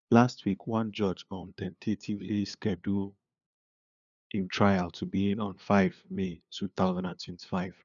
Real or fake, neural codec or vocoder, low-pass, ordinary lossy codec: fake; codec, 16 kHz, 2 kbps, FunCodec, trained on LibriTTS, 25 frames a second; 7.2 kHz; none